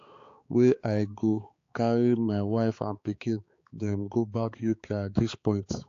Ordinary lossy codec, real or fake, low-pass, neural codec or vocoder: AAC, 48 kbps; fake; 7.2 kHz; codec, 16 kHz, 4 kbps, X-Codec, HuBERT features, trained on balanced general audio